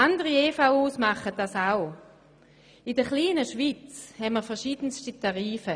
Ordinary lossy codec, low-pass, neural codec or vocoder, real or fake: none; 9.9 kHz; none; real